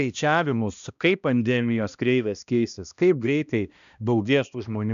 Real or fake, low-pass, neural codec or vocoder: fake; 7.2 kHz; codec, 16 kHz, 1 kbps, X-Codec, HuBERT features, trained on balanced general audio